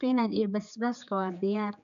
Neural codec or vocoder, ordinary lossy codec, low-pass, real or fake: codec, 16 kHz, 4 kbps, X-Codec, HuBERT features, trained on general audio; MP3, 96 kbps; 7.2 kHz; fake